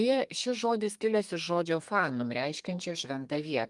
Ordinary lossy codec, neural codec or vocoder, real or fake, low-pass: Opus, 32 kbps; codec, 32 kHz, 1.9 kbps, SNAC; fake; 10.8 kHz